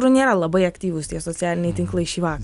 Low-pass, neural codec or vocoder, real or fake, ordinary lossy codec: 10.8 kHz; none; real; Opus, 64 kbps